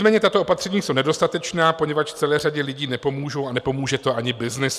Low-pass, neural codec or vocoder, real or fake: 14.4 kHz; none; real